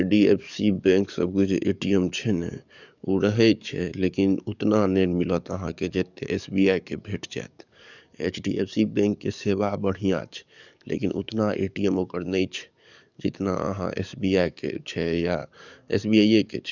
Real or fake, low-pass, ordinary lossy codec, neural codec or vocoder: fake; 7.2 kHz; none; codec, 44.1 kHz, 7.8 kbps, DAC